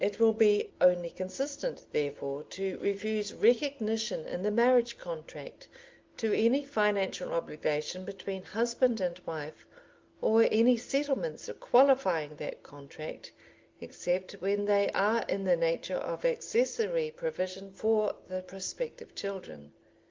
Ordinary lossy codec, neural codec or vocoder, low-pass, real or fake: Opus, 32 kbps; none; 7.2 kHz; real